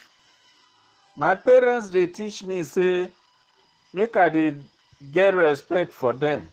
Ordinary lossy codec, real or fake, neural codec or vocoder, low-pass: Opus, 16 kbps; fake; codec, 32 kHz, 1.9 kbps, SNAC; 14.4 kHz